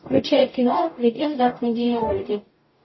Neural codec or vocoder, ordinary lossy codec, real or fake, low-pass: codec, 44.1 kHz, 0.9 kbps, DAC; MP3, 24 kbps; fake; 7.2 kHz